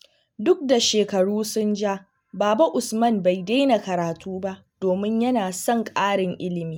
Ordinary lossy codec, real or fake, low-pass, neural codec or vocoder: none; real; none; none